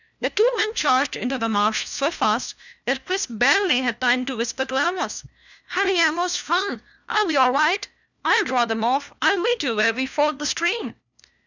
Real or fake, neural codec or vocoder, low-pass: fake; codec, 16 kHz, 1 kbps, FunCodec, trained on LibriTTS, 50 frames a second; 7.2 kHz